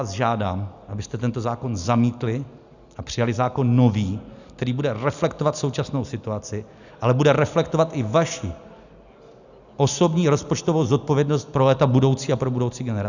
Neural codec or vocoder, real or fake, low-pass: none; real; 7.2 kHz